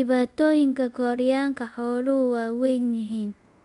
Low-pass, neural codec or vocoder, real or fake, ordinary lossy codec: 10.8 kHz; codec, 24 kHz, 0.5 kbps, DualCodec; fake; MP3, 64 kbps